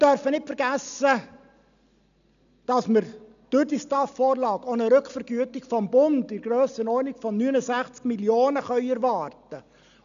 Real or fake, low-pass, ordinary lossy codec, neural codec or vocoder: real; 7.2 kHz; none; none